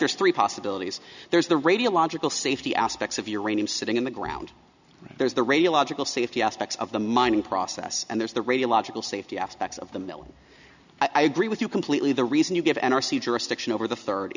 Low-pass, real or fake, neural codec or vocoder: 7.2 kHz; real; none